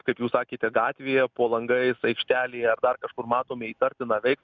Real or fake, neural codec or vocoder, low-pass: real; none; 7.2 kHz